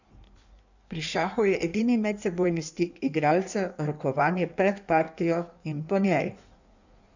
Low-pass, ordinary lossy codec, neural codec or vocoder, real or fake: 7.2 kHz; none; codec, 16 kHz in and 24 kHz out, 1.1 kbps, FireRedTTS-2 codec; fake